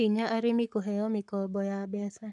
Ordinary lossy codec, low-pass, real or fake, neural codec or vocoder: none; 10.8 kHz; fake; codec, 44.1 kHz, 7.8 kbps, Pupu-Codec